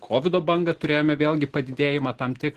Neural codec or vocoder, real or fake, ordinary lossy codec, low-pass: none; real; Opus, 16 kbps; 14.4 kHz